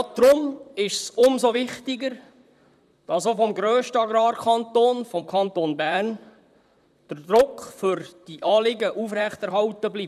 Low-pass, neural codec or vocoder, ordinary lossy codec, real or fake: 14.4 kHz; vocoder, 44.1 kHz, 128 mel bands, Pupu-Vocoder; none; fake